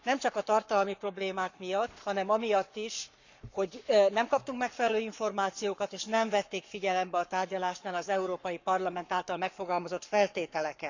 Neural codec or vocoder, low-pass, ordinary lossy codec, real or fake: codec, 44.1 kHz, 7.8 kbps, Pupu-Codec; 7.2 kHz; none; fake